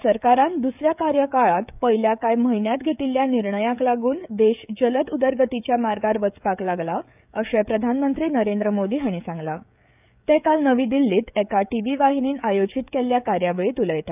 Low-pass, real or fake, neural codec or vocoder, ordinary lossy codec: 3.6 kHz; fake; codec, 16 kHz, 16 kbps, FreqCodec, smaller model; none